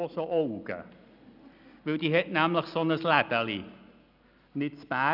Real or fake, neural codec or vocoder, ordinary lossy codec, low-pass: real; none; none; 5.4 kHz